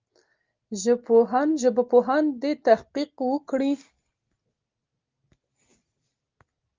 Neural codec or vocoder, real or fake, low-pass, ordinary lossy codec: none; real; 7.2 kHz; Opus, 32 kbps